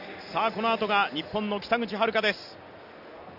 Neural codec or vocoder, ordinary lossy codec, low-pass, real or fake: none; none; 5.4 kHz; real